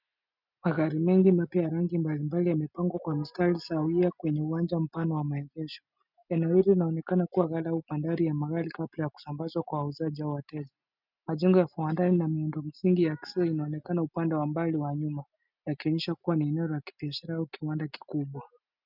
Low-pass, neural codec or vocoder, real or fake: 5.4 kHz; none; real